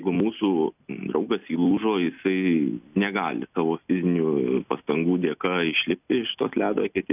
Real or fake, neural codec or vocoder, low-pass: real; none; 3.6 kHz